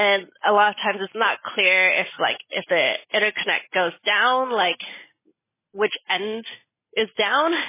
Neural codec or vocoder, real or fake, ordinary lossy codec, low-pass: none; real; MP3, 16 kbps; 3.6 kHz